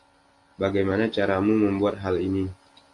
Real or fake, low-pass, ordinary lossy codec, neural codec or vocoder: real; 10.8 kHz; AAC, 48 kbps; none